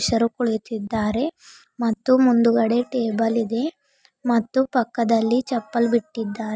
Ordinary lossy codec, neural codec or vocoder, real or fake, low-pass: none; none; real; none